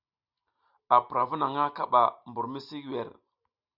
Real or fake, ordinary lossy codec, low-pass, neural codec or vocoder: real; Opus, 64 kbps; 5.4 kHz; none